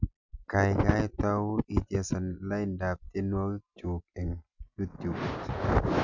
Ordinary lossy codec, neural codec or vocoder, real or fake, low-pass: none; none; real; 7.2 kHz